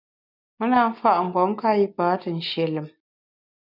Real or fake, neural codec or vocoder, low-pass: real; none; 5.4 kHz